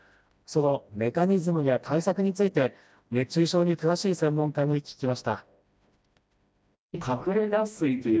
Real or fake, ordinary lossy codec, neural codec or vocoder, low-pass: fake; none; codec, 16 kHz, 1 kbps, FreqCodec, smaller model; none